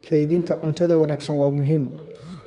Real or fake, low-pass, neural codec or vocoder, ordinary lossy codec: fake; 10.8 kHz; codec, 24 kHz, 1 kbps, SNAC; none